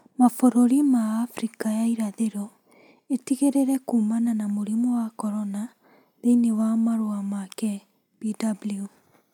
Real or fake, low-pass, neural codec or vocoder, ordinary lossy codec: real; 19.8 kHz; none; none